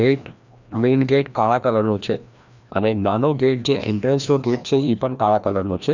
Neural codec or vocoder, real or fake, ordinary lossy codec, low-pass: codec, 16 kHz, 1 kbps, FreqCodec, larger model; fake; none; 7.2 kHz